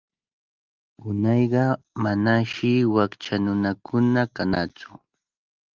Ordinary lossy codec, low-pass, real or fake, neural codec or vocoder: Opus, 32 kbps; 7.2 kHz; real; none